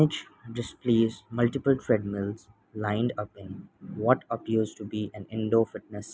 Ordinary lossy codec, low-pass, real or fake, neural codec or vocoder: none; none; real; none